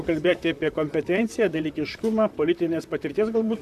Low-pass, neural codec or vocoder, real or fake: 14.4 kHz; vocoder, 44.1 kHz, 128 mel bands, Pupu-Vocoder; fake